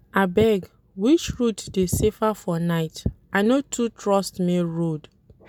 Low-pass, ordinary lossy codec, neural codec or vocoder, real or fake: 19.8 kHz; none; none; real